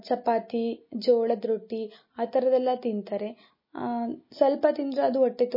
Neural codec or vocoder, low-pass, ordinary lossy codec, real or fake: none; 5.4 kHz; MP3, 24 kbps; real